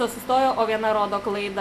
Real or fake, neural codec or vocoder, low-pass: real; none; 14.4 kHz